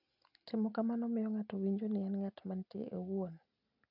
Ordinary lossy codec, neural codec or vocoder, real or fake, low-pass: none; none; real; 5.4 kHz